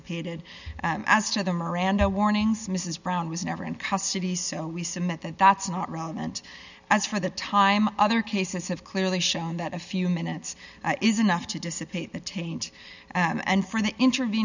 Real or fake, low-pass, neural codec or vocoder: real; 7.2 kHz; none